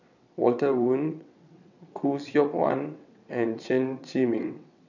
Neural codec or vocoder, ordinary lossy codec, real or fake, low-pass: vocoder, 22.05 kHz, 80 mel bands, WaveNeXt; none; fake; 7.2 kHz